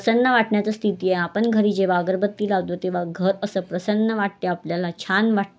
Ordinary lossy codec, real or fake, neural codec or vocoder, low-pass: none; real; none; none